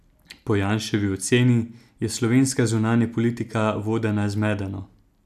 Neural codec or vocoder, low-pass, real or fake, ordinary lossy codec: none; 14.4 kHz; real; none